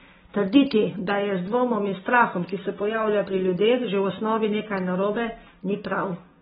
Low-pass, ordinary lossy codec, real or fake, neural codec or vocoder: 10.8 kHz; AAC, 16 kbps; real; none